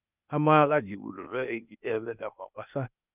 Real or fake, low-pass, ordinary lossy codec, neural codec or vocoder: fake; 3.6 kHz; none; codec, 16 kHz, 0.8 kbps, ZipCodec